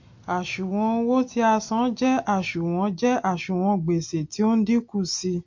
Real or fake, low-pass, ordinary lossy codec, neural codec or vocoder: real; 7.2 kHz; MP3, 48 kbps; none